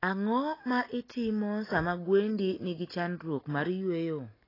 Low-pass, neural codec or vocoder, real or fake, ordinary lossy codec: 5.4 kHz; none; real; AAC, 24 kbps